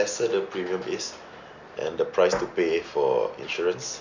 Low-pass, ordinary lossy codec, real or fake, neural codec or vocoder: 7.2 kHz; none; real; none